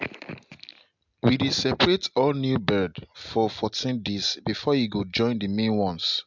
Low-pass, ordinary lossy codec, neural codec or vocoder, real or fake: 7.2 kHz; MP3, 64 kbps; none; real